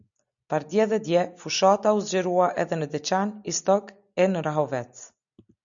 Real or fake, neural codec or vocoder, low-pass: real; none; 7.2 kHz